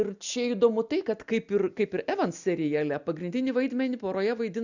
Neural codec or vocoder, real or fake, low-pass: none; real; 7.2 kHz